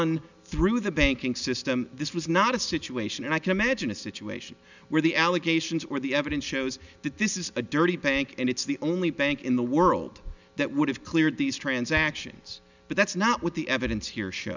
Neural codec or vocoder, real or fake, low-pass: none; real; 7.2 kHz